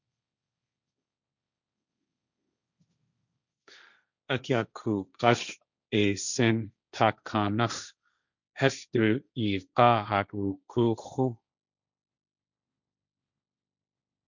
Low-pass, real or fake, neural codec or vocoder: 7.2 kHz; fake; codec, 16 kHz, 1.1 kbps, Voila-Tokenizer